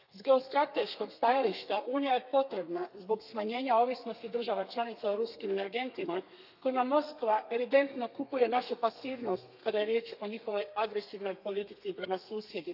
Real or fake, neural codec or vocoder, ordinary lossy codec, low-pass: fake; codec, 32 kHz, 1.9 kbps, SNAC; none; 5.4 kHz